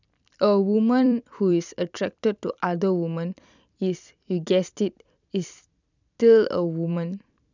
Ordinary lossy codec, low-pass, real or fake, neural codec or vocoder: none; 7.2 kHz; fake; vocoder, 44.1 kHz, 128 mel bands every 256 samples, BigVGAN v2